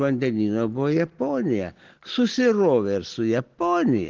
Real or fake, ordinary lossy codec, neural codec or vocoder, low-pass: real; Opus, 16 kbps; none; 7.2 kHz